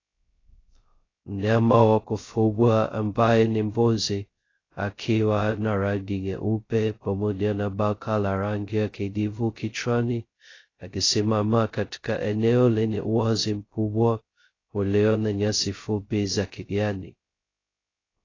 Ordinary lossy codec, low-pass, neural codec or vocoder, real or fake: AAC, 32 kbps; 7.2 kHz; codec, 16 kHz, 0.2 kbps, FocalCodec; fake